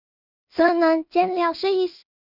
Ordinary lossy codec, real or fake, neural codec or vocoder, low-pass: Opus, 64 kbps; fake; codec, 16 kHz in and 24 kHz out, 0.4 kbps, LongCat-Audio-Codec, two codebook decoder; 5.4 kHz